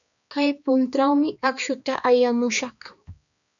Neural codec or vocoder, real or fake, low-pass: codec, 16 kHz, 2 kbps, X-Codec, HuBERT features, trained on balanced general audio; fake; 7.2 kHz